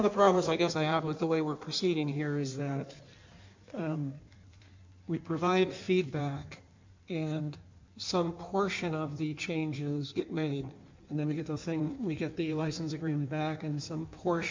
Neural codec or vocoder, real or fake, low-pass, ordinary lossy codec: codec, 16 kHz in and 24 kHz out, 1.1 kbps, FireRedTTS-2 codec; fake; 7.2 kHz; MP3, 64 kbps